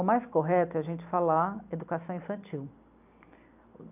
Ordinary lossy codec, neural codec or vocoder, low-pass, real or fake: none; none; 3.6 kHz; real